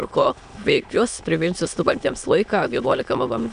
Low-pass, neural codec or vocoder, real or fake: 9.9 kHz; autoencoder, 22.05 kHz, a latent of 192 numbers a frame, VITS, trained on many speakers; fake